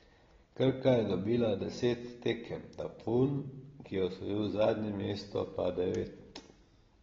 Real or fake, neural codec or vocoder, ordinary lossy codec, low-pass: real; none; AAC, 24 kbps; 7.2 kHz